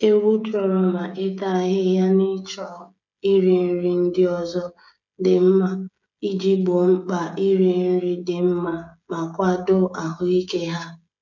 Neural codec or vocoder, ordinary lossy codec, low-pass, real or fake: codec, 16 kHz, 16 kbps, FreqCodec, smaller model; none; 7.2 kHz; fake